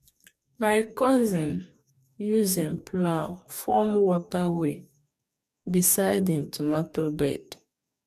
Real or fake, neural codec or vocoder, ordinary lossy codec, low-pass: fake; codec, 44.1 kHz, 2.6 kbps, DAC; none; 14.4 kHz